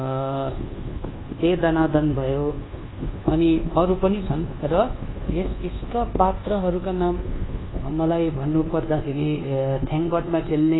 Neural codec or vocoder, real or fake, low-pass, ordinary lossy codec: codec, 24 kHz, 1.2 kbps, DualCodec; fake; 7.2 kHz; AAC, 16 kbps